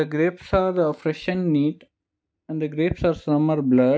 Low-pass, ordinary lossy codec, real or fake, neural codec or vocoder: none; none; real; none